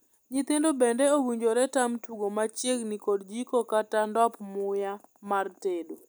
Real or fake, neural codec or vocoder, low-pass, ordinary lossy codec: real; none; none; none